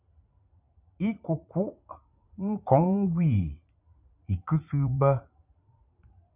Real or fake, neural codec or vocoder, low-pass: fake; vocoder, 44.1 kHz, 80 mel bands, Vocos; 3.6 kHz